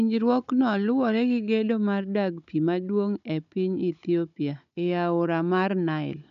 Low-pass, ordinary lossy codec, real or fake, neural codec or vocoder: 7.2 kHz; none; fake; codec, 16 kHz, 16 kbps, FunCodec, trained on Chinese and English, 50 frames a second